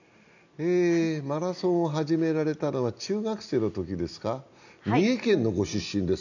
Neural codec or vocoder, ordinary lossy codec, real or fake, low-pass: none; none; real; 7.2 kHz